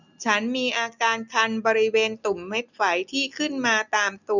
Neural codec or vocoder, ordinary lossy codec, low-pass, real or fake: none; none; 7.2 kHz; real